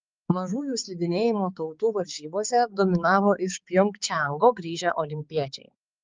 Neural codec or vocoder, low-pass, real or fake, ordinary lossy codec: codec, 16 kHz, 4 kbps, X-Codec, HuBERT features, trained on balanced general audio; 7.2 kHz; fake; Opus, 24 kbps